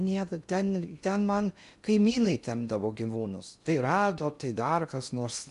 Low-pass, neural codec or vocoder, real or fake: 10.8 kHz; codec, 16 kHz in and 24 kHz out, 0.6 kbps, FocalCodec, streaming, 2048 codes; fake